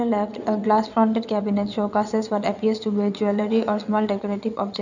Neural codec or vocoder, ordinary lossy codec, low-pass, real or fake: vocoder, 22.05 kHz, 80 mel bands, WaveNeXt; none; 7.2 kHz; fake